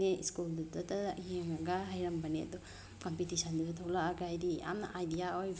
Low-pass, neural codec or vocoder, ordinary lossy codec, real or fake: none; none; none; real